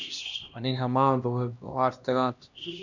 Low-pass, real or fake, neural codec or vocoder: 7.2 kHz; fake; codec, 16 kHz, 1 kbps, X-Codec, HuBERT features, trained on LibriSpeech